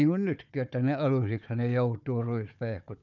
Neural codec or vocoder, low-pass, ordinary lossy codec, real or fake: codec, 16 kHz, 4 kbps, FunCodec, trained on Chinese and English, 50 frames a second; 7.2 kHz; none; fake